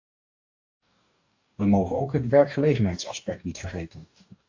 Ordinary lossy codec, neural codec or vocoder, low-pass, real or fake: AAC, 48 kbps; codec, 44.1 kHz, 2.6 kbps, DAC; 7.2 kHz; fake